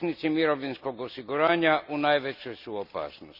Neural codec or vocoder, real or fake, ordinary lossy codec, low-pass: none; real; none; 5.4 kHz